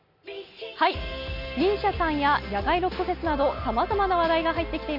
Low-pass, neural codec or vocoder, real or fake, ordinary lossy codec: 5.4 kHz; none; real; none